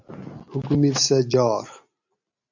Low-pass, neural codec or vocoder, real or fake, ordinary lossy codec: 7.2 kHz; none; real; MP3, 64 kbps